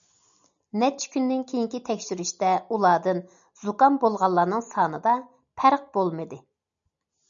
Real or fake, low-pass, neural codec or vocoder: real; 7.2 kHz; none